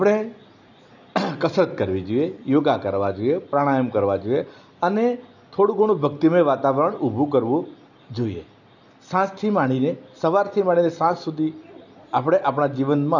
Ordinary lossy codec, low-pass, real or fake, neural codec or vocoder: none; 7.2 kHz; real; none